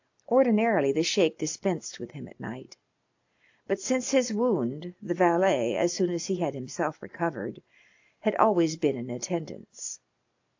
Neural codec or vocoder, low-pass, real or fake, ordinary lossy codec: none; 7.2 kHz; real; AAC, 48 kbps